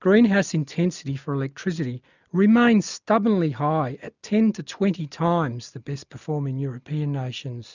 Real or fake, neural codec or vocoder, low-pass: real; none; 7.2 kHz